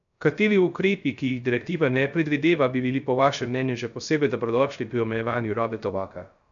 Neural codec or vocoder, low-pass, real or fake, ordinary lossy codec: codec, 16 kHz, 0.3 kbps, FocalCodec; 7.2 kHz; fake; MP3, 96 kbps